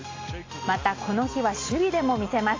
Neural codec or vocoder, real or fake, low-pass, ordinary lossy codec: none; real; 7.2 kHz; AAC, 32 kbps